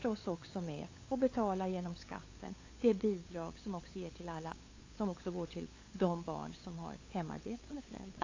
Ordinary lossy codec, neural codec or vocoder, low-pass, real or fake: AAC, 32 kbps; codec, 16 kHz, 8 kbps, FunCodec, trained on LibriTTS, 25 frames a second; 7.2 kHz; fake